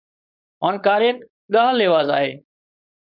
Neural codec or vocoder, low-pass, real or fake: codec, 16 kHz, 4.8 kbps, FACodec; 5.4 kHz; fake